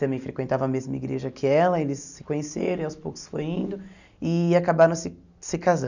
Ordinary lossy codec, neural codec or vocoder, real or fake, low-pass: none; none; real; 7.2 kHz